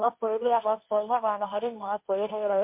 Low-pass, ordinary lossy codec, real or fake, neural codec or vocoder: 3.6 kHz; MP3, 32 kbps; fake; codec, 16 kHz, 1.1 kbps, Voila-Tokenizer